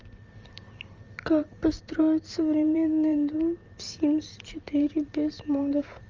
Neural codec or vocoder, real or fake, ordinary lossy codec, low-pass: vocoder, 44.1 kHz, 128 mel bands every 512 samples, BigVGAN v2; fake; Opus, 32 kbps; 7.2 kHz